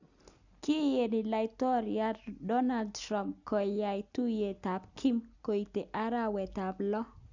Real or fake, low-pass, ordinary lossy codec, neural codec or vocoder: real; 7.2 kHz; none; none